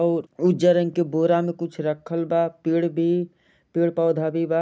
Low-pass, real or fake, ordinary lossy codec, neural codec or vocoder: none; real; none; none